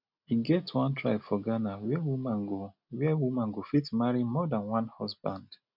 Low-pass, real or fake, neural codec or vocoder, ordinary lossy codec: 5.4 kHz; real; none; none